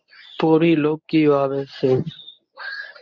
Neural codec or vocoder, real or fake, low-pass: codec, 24 kHz, 0.9 kbps, WavTokenizer, medium speech release version 1; fake; 7.2 kHz